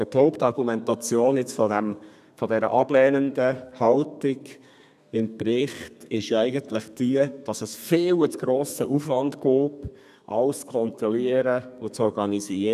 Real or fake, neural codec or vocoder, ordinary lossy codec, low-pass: fake; codec, 32 kHz, 1.9 kbps, SNAC; none; 14.4 kHz